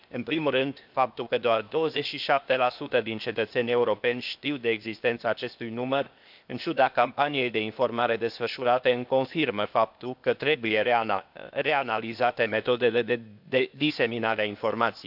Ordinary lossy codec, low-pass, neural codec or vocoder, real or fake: none; 5.4 kHz; codec, 16 kHz, 0.8 kbps, ZipCodec; fake